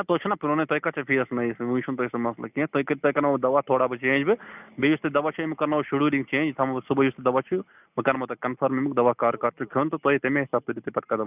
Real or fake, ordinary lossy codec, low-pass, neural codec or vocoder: real; none; 3.6 kHz; none